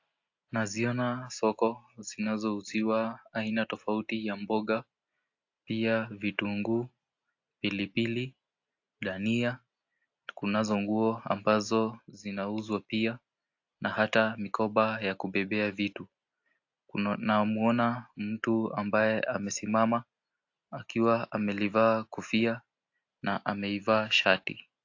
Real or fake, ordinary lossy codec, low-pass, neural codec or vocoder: real; AAC, 48 kbps; 7.2 kHz; none